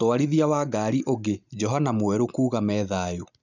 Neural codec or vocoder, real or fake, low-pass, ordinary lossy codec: none; real; 7.2 kHz; none